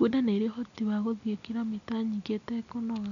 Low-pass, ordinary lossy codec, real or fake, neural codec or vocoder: 7.2 kHz; none; real; none